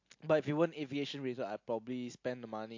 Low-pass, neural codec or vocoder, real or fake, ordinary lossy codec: 7.2 kHz; none; real; AAC, 48 kbps